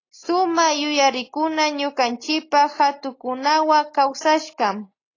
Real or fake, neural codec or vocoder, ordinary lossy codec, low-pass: real; none; AAC, 32 kbps; 7.2 kHz